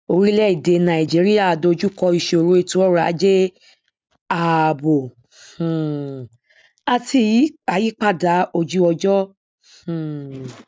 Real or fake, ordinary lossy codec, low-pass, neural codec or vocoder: real; none; none; none